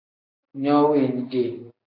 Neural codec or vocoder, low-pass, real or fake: none; 5.4 kHz; real